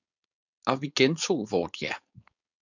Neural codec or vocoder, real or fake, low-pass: codec, 16 kHz, 4.8 kbps, FACodec; fake; 7.2 kHz